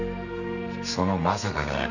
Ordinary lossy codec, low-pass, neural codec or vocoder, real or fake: none; 7.2 kHz; codec, 32 kHz, 1.9 kbps, SNAC; fake